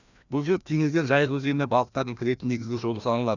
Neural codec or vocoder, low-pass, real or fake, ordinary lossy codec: codec, 16 kHz, 1 kbps, FreqCodec, larger model; 7.2 kHz; fake; none